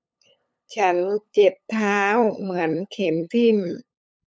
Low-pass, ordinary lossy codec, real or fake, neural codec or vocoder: none; none; fake; codec, 16 kHz, 8 kbps, FunCodec, trained on LibriTTS, 25 frames a second